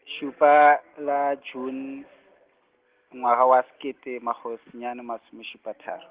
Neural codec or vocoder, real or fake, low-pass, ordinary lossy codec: none; real; 3.6 kHz; Opus, 16 kbps